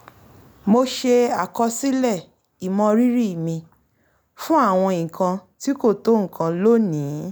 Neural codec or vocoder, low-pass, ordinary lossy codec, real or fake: none; none; none; real